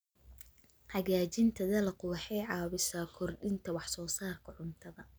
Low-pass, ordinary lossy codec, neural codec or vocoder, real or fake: none; none; none; real